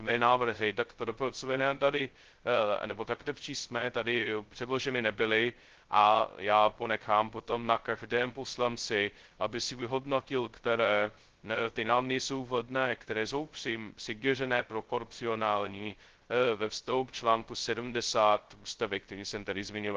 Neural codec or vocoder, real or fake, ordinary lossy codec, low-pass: codec, 16 kHz, 0.2 kbps, FocalCodec; fake; Opus, 16 kbps; 7.2 kHz